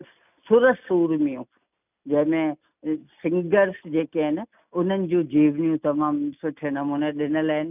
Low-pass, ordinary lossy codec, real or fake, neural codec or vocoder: 3.6 kHz; none; real; none